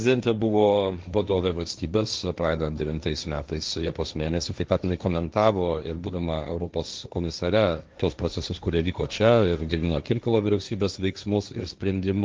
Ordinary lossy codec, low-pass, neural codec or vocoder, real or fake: Opus, 24 kbps; 7.2 kHz; codec, 16 kHz, 1.1 kbps, Voila-Tokenizer; fake